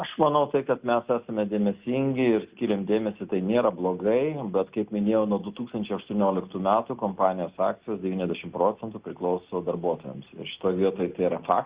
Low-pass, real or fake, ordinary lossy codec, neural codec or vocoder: 3.6 kHz; real; Opus, 64 kbps; none